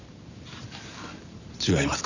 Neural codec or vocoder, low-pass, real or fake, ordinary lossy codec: none; 7.2 kHz; real; none